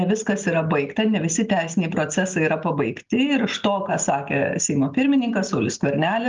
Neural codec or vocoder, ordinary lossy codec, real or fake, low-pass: none; Opus, 32 kbps; real; 7.2 kHz